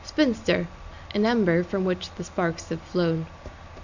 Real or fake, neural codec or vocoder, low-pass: real; none; 7.2 kHz